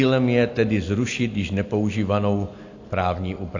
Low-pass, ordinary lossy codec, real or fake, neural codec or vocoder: 7.2 kHz; AAC, 48 kbps; real; none